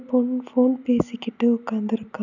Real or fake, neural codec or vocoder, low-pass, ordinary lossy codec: real; none; 7.2 kHz; none